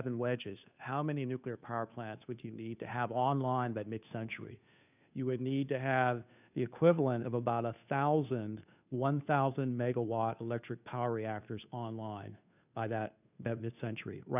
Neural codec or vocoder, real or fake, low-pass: codec, 16 kHz, 2 kbps, FunCodec, trained on Chinese and English, 25 frames a second; fake; 3.6 kHz